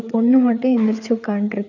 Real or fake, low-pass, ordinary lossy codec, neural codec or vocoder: fake; 7.2 kHz; none; codec, 16 kHz, 4 kbps, FreqCodec, larger model